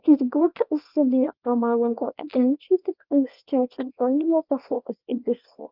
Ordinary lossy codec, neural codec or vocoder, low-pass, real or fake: Opus, 24 kbps; codec, 24 kHz, 0.9 kbps, WavTokenizer, small release; 5.4 kHz; fake